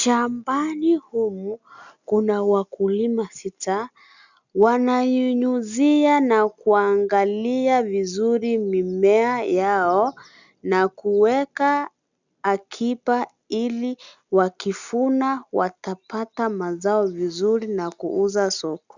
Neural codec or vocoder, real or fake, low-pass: none; real; 7.2 kHz